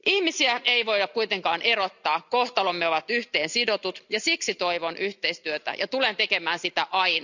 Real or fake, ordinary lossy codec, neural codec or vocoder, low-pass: real; none; none; 7.2 kHz